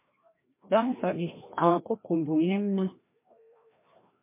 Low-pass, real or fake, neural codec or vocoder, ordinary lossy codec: 3.6 kHz; fake; codec, 16 kHz, 1 kbps, FreqCodec, larger model; MP3, 24 kbps